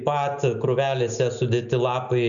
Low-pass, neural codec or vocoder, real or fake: 7.2 kHz; none; real